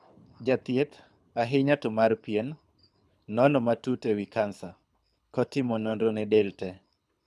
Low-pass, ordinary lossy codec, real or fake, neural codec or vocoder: none; none; fake; codec, 24 kHz, 6 kbps, HILCodec